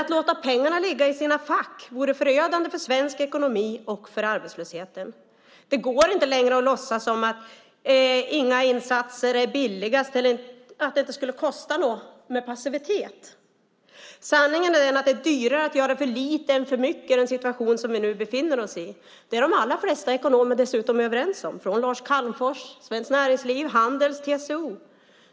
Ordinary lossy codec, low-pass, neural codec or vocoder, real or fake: none; none; none; real